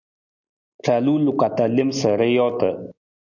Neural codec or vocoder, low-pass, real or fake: none; 7.2 kHz; real